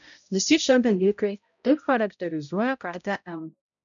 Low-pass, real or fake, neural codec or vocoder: 7.2 kHz; fake; codec, 16 kHz, 0.5 kbps, X-Codec, HuBERT features, trained on balanced general audio